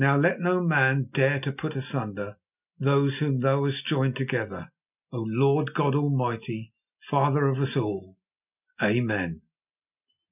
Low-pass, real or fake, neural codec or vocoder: 3.6 kHz; real; none